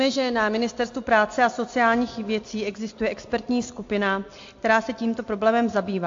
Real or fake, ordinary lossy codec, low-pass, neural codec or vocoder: real; AAC, 48 kbps; 7.2 kHz; none